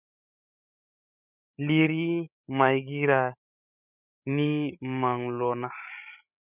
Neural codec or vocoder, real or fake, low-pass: codec, 16 kHz, 8 kbps, FreqCodec, larger model; fake; 3.6 kHz